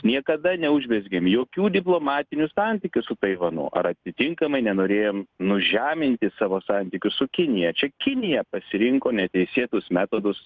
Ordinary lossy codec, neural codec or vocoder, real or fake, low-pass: Opus, 24 kbps; none; real; 7.2 kHz